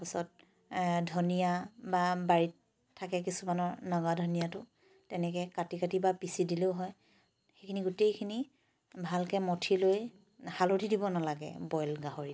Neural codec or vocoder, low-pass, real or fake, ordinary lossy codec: none; none; real; none